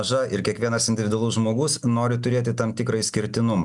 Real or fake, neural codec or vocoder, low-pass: real; none; 10.8 kHz